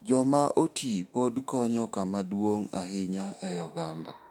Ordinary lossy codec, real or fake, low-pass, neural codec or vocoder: MP3, 96 kbps; fake; 19.8 kHz; autoencoder, 48 kHz, 32 numbers a frame, DAC-VAE, trained on Japanese speech